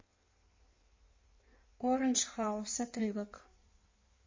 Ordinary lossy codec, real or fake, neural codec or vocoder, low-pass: MP3, 32 kbps; fake; codec, 16 kHz in and 24 kHz out, 1.1 kbps, FireRedTTS-2 codec; 7.2 kHz